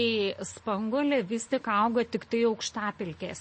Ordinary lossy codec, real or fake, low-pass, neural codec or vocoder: MP3, 32 kbps; real; 9.9 kHz; none